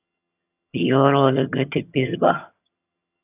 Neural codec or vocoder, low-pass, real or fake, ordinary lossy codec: vocoder, 22.05 kHz, 80 mel bands, HiFi-GAN; 3.6 kHz; fake; AAC, 24 kbps